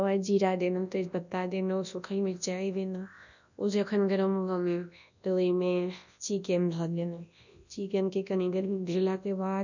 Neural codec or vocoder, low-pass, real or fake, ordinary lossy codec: codec, 24 kHz, 0.9 kbps, WavTokenizer, large speech release; 7.2 kHz; fake; none